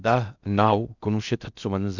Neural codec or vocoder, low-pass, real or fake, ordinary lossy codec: codec, 16 kHz in and 24 kHz out, 0.6 kbps, FocalCodec, streaming, 4096 codes; 7.2 kHz; fake; none